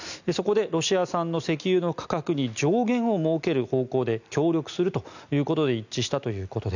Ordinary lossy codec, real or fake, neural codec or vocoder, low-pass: none; real; none; 7.2 kHz